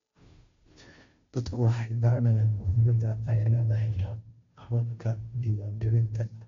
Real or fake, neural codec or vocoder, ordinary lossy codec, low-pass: fake; codec, 16 kHz, 0.5 kbps, FunCodec, trained on Chinese and English, 25 frames a second; MP3, 48 kbps; 7.2 kHz